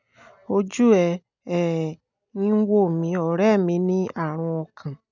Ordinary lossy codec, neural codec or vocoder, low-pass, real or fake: none; none; 7.2 kHz; real